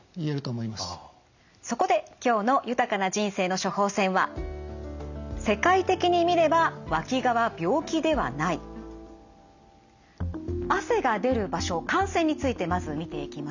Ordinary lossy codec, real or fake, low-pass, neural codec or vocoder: none; real; 7.2 kHz; none